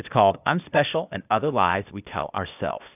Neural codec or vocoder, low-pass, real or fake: codec, 16 kHz, 0.8 kbps, ZipCodec; 3.6 kHz; fake